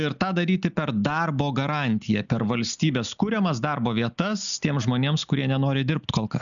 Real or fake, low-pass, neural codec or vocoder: real; 7.2 kHz; none